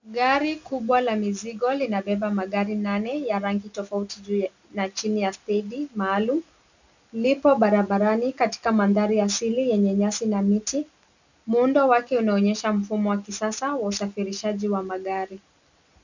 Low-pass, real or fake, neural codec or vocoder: 7.2 kHz; real; none